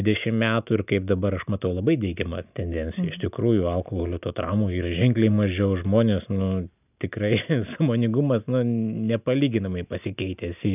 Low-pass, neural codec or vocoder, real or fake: 3.6 kHz; none; real